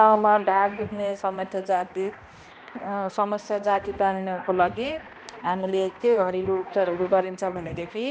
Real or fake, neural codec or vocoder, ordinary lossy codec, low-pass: fake; codec, 16 kHz, 1 kbps, X-Codec, HuBERT features, trained on balanced general audio; none; none